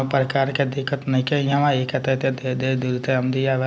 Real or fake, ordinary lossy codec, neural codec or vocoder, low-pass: real; none; none; none